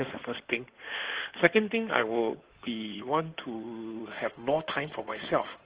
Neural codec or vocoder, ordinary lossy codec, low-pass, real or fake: codec, 16 kHz in and 24 kHz out, 2.2 kbps, FireRedTTS-2 codec; Opus, 16 kbps; 3.6 kHz; fake